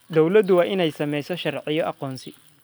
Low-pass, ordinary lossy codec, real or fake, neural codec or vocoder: none; none; real; none